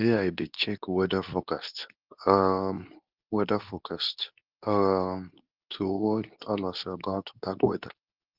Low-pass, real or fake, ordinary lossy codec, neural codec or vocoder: 5.4 kHz; fake; Opus, 24 kbps; codec, 24 kHz, 0.9 kbps, WavTokenizer, medium speech release version 2